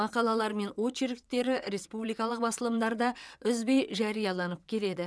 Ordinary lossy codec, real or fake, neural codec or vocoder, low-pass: none; fake; vocoder, 22.05 kHz, 80 mel bands, WaveNeXt; none